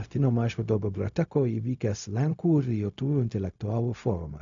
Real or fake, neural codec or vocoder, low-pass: fake; codec, 16 kHz, 0.4 kbps, LongCat-Audio-Codec; 7.2 kHz